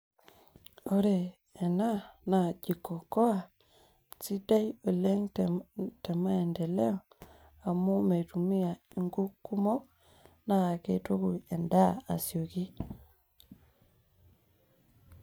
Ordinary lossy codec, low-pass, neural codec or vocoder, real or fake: none; none; none; real